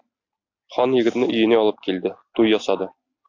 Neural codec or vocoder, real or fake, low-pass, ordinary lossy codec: none; real; 7.2 kHz; AAC, 48 kbps